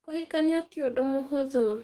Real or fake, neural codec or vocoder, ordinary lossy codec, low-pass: fake; codec, 44.1 kHz, 2.6 kbps, DAC; Opus, 32 kbps; 19.8 kHz